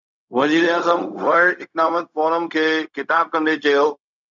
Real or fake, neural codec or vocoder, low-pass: fake; codec, 16 kHz, 0.4 kbps, LongCat-Audio-Codec; 7.2 kHz